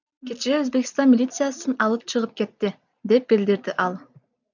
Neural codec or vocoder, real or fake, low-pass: none; real; 7.2 kHz